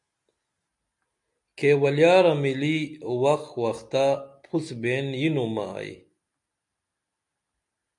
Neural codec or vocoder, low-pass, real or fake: none; 10.8 kHz; real